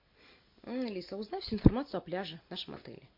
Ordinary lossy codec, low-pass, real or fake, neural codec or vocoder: MP3, 32 kbps; 5.4 kHz; real; none